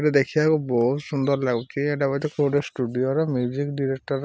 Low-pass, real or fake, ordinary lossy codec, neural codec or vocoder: none; real; none; none